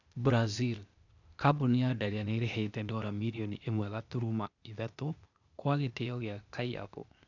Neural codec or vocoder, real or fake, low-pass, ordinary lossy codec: codec, 16 kHz, 0.8 kbps, ZipCodec; fake; 7.2 kHz; none